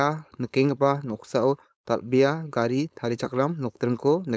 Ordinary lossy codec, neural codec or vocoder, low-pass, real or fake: none; codec, 16 kHz, 4.8 kbps, FACodec; none; fake